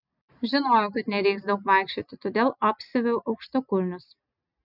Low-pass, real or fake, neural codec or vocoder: 5.4 kHz; real; none